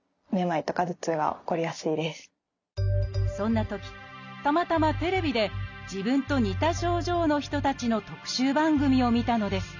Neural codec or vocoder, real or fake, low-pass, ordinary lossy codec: none; real; 7.2 kHz; none